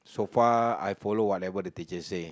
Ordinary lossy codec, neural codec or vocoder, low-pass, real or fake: none; none; none; real